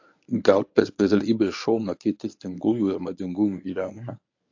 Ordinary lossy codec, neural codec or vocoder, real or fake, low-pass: AAC, 48 kbps; codec, 24 kHz, 0.9 kbps, WavTokenizer, medium speech release version 1; fake; 7.2 kHz